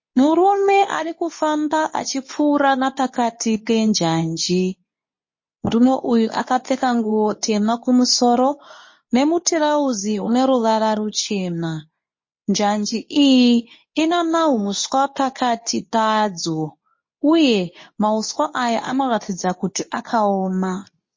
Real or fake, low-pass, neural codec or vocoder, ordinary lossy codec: fake; 7.2 kHz; codec, 24 kHz, 0.9 kbps, WavTokenizer, medium speech release version 2; MP3, 32 kbps